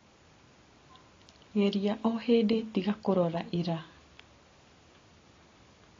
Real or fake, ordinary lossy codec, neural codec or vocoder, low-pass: real; AAC, 32 kbps; none; 7.2 kHz